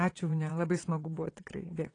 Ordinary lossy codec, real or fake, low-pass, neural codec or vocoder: AAC, 32 kbps; fake; 9.9 kHz; vocoder, 22.05 kHz, 80 mel bands, WaveNeXt